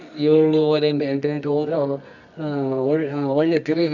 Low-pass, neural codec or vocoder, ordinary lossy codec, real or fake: 7.2 kHz; codec, 24 kHz, 0.9 kbps, WavTokenizer, medium music audio release; none; fake